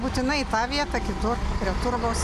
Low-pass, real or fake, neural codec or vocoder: 14.4 kHz; real; none